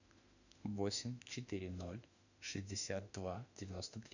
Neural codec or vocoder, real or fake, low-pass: autoencoder, 48 kHz, 32 numbers a frame, DAC-VAE, trained on Japanese speech; fake; 7.2 kHz